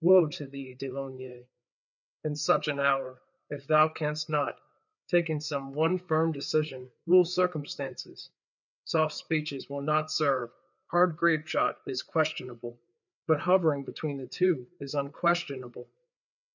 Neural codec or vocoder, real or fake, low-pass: codec, 16 kHz, 4 kbps, FreqCodec, larger model; fake; 7.2 kHz